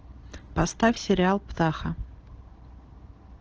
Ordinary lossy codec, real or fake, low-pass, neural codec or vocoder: Opus, 16 kbps; real; 7.2 kHz; none